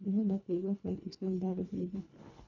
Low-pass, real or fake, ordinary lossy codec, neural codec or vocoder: 7.2 kHz; fake; none; codec, 24 kHz, 1.5 kbps, HILCodec